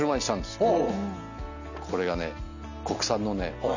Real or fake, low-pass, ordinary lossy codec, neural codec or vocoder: real; 7.2 kHz; none; none